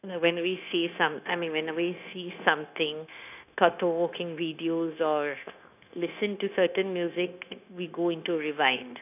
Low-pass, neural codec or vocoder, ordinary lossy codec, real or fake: 3.6 kHz; codec, 16 kHz, 0.9 kbps, LongCat-Audio-Codec; none; fake